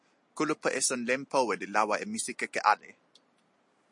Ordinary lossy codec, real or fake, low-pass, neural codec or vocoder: MP3, 48 kbps; real; 10.8 kHz; none